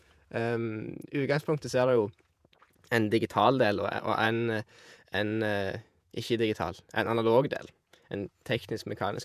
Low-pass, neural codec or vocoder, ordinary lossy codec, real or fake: 14.4 kHz; vocoder, 48 kHz, 128 mel bands, Vocos; none; fake